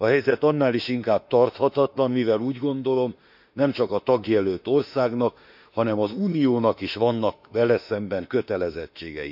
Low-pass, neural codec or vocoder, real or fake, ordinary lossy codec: 5.4 kHz; autoencoder, 48 kHz, 32 numbers a frame, DAC-VAE, trained on Japanese speech; fake; none